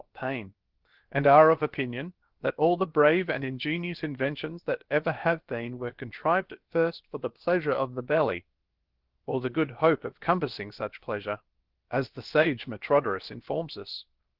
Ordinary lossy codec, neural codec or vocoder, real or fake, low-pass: Opus, 16 kbps; codec, 16 kHz, about 1 kbps, DyCAST, with the encoder's durations; fake; 5.4 kHz